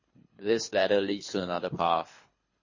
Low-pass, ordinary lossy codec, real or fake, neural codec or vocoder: 7.2 kHz; MP3, 32 kbps; fake; codec, 24 kHz, 3 kbps, HILCodec